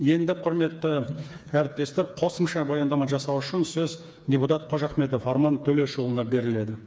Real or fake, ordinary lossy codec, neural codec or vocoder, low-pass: fake; none; codec, 16 kHz, 4 kbps, FreqCodec, smaller model; none